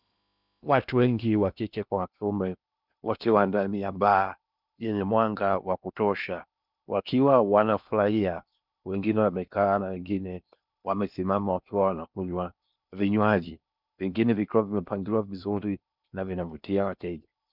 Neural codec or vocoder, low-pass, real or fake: codec, 16 kHz in and 24 kHz out, 0.8 kbps, FocalCodec, streaming, 65536 codes; 5.4 kHz; fake